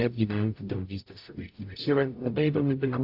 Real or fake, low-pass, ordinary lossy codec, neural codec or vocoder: fake; 5.4 kHz; AAC, 32 kbps; codec, 44.1 kHz, 0.9 kbps, DAC